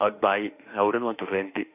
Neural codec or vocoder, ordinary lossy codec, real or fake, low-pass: autoencoder, 48 kHz, 32 numbers a frame, DAC-VAE, trained on Japanese speech; none; fake; 3.6 kHz